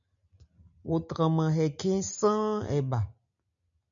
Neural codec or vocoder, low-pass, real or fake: none; 7.2 kHz; real